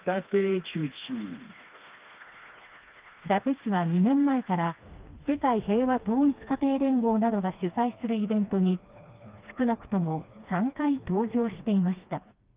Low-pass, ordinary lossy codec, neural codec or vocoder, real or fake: 3.6 kHz; Opus, 32 kbps; codec, 16 kHz, 2 kbps, FreqCodec, smaller model; fake